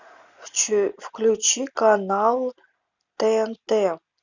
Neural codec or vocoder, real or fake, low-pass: none; real; 7.2 kHz